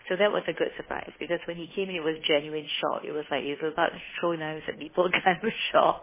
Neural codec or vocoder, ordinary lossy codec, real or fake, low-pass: codec, 16 kHz, 2 kbps, FunCodec, trained on Chinese and English, 25 frames a second; MP3, 16 kbps; fake; 3.6 kHz